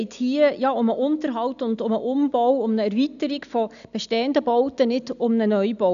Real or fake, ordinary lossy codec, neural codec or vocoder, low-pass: real; none; none; 7.2 kHz